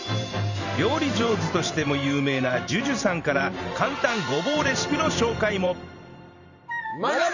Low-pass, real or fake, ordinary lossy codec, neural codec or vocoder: 7.2 kHz; fake; none; vocoder, 44.1 kHz, 128 mel bands every 512 samples, BigVGAN v2